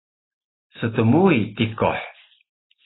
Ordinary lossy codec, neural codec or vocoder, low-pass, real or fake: AAC, 16 kbps; none; 7.2 kHz; real